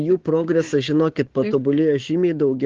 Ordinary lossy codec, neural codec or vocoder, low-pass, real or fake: Opus, 16 kbps; codec, 16 kHz, 6 kbps, DAC; 7.2 kHz; fake